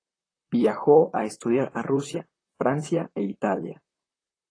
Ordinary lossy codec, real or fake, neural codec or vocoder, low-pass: AAC, 32 kbps; fake; vocoder, 44.1 kHz, 128 mel bands, Pupu-Vocoder; 9.9 kHz